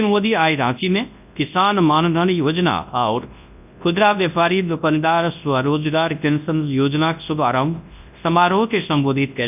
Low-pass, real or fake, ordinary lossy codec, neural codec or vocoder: 3.6 kHz; fake; none; codec, 24 kHz, 0.9 kbps, WavTokenizer, large speech release